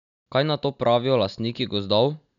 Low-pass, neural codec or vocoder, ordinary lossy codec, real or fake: 7.2 kHz; none; none; real